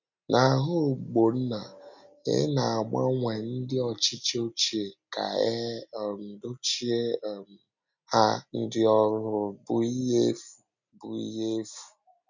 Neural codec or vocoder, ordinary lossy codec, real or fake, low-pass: none; none; real; 7.2 kHz